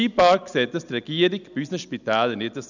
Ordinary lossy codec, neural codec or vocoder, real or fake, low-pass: none; none; real; 7.2 kHz